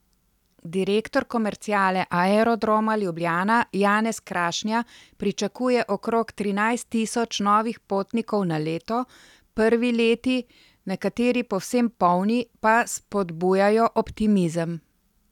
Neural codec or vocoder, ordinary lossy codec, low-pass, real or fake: none; none; 19.8 kHz; real